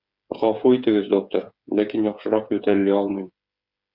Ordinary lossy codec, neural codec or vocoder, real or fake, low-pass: Opus, 64 kbps; codec, 16 kHz, 8 kbps, FreqCodec, smaller model; fake; 5.4 kHz